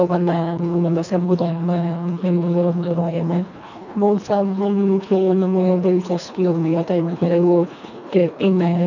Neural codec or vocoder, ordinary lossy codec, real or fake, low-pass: codec, 24 kHz, 1.5 kbps, HILCodec; none; fake; 7.2 kHz